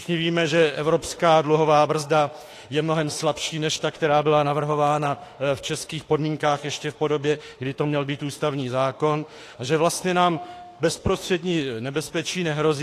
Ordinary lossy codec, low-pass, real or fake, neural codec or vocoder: AAC, 48 kbps; 14.4 kHz; fake; autoencoder, 48 kHz, 32 numbers a frame, DAC-VAE, trained on Japanese speech